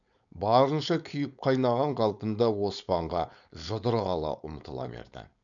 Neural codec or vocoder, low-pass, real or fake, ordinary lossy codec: codec, 16 kHz, 4.8 kbps, FACodec; 7.2 kHz; fake; none